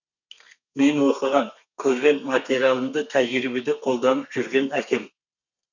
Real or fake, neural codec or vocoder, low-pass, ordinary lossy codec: fake; codec, 32 kHz, 1.9 kbps, SNAC; 7.2 kHz; none